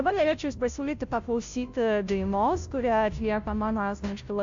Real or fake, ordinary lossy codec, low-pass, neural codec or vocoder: fake; AAC, 64 kbps; 7.2 kHz; codec, 16 kHz, 0.5 kbps, FunCodec, trained on Chinese and English, 25 frames a second